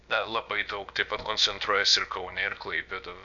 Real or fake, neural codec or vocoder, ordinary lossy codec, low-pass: fake; codec, 16 kHz, about 1 kbps, DyCAST, with the encoder's durations; MP3, 96 kbps; 7.2 kHz